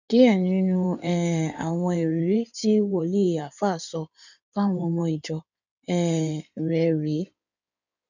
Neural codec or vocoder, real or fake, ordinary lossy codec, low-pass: codec, 16 kHz in and 24 kHz out, 2.2 kbps, FireRedTTS-2 codec; fake; none; 7.2 kHz